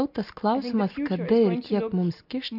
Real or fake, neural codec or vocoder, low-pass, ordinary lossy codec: real; none; 5.4 kHz; MP3, 48 kbps